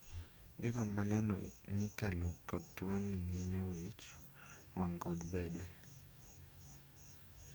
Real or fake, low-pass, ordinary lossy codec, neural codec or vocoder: fake; none; none; codec, 44.1 kHz, 2.6 kbps, DAC